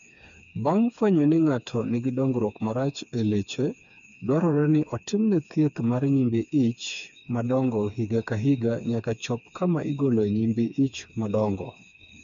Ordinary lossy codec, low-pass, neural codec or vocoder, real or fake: MP3, 64 kbps; 7.2 kHz; codec, 16 kHz, 4 kbps, FreqCodec, smaller model; fake